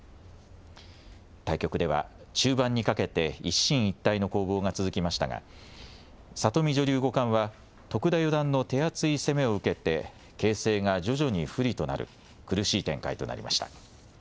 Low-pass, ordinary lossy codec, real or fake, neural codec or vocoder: none; none; real; none